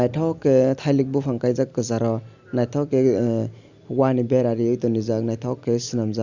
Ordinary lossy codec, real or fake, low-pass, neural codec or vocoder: Opus, 64 kbps; real; 7.2 kHz; none